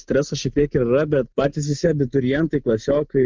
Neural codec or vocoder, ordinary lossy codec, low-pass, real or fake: none; Opus, 24 kbps; 7.2 kHz; real